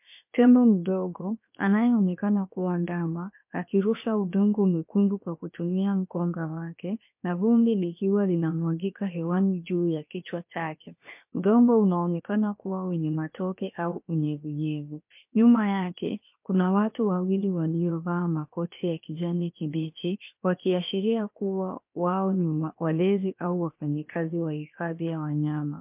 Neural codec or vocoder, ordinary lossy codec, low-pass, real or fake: codec, 16 kHz, 0.7 kbps, FocalCodec; MP3, 32 kbps; 3.6 kHz; fake